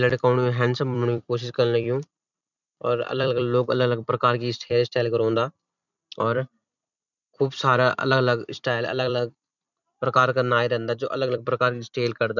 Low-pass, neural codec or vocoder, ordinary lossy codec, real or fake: 7.2 kHz; vocoder, 44.1 kHz, 128 mel bands every 256 samples, BigVGAN v2; none; fake